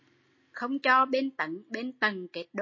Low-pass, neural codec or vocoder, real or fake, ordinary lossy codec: 7.2 kHz; none; real; MP3, 32 kbps